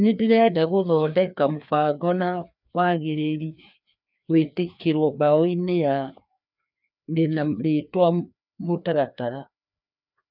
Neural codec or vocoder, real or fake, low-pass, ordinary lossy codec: codec, 16 kHz, 2 kbps, FreqCodec, larger model; fake; 5.4 kHz; none